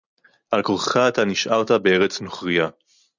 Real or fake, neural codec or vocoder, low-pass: real; none; 7.2 kHz